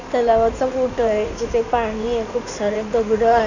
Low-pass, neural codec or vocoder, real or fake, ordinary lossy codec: 7.2 kHz; codec, 16 kHz in and 24 kHz out, 2.2 kbps, FireRedTTS-2 codec; fake; none